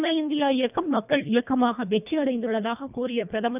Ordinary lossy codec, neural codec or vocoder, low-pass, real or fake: none; codec, 24 kHz, 1.5 kbps, HILCodec; 3.6 kHz; fake